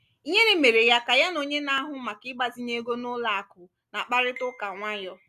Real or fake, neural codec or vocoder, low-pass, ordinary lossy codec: real; none; 14.4 kHz; none